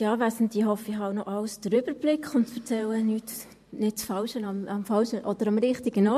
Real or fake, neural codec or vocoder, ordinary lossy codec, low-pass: fake; vocoder, 44.1 kHz, 128 mel bands every 512 samples, BigVGAN v2; MP3, 64 kbps; 14.4 kHz